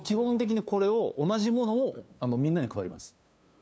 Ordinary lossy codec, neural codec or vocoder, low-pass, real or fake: none; codec, 16 kHz, 2 kbps, FunCodec, trained on LibriTTS, 25 frames a second; none; fake